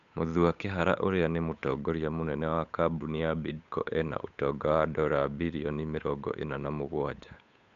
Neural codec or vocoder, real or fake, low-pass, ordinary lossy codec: codec, 16 kHz, 8 kbps, FunCodec, trained on Chinese and English, 25 frames a second; fake; 7.2 kHz; none